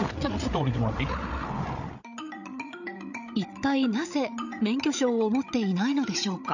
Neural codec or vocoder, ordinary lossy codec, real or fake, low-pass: codec, 16 kHz, 16 kbps, FreqCodec, larger model; none; fake; 7.2 kHz